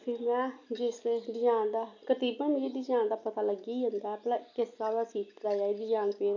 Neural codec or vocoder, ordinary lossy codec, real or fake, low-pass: none; none; real; 7.2 kHz